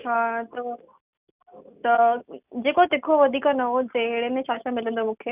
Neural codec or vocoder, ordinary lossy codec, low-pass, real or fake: none; none; 3.6 kHz; real